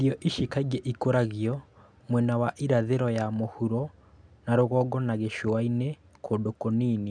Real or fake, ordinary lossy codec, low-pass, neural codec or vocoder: real; MP3, 96 kbps; 9.9 kHz; none